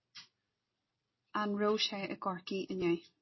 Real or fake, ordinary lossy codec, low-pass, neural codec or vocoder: real; MP3, 24 kbps; 7.2 kHz; none